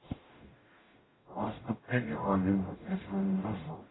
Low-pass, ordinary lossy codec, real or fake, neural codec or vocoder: 7.2 kHz; AAC, 16 kbps; fake; codec, 44.1 kHz, 0.9 kbps, DAC